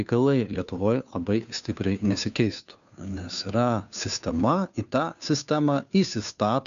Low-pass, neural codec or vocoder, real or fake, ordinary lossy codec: 7.2 kHz; codec, 16 kHz, 2 kbps, FunCodec, trained on Chinese and English, 25 frames a second; fake; MP3, 96 kbps